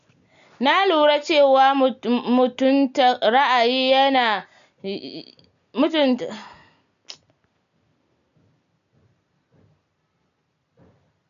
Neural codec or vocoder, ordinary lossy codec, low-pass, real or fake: none; none; 7.2 kHz; real